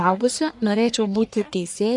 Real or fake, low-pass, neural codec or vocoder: fake; 10.8 kHz; codec, 44.1 kHz, 1.7 kbps, Pupu-Codec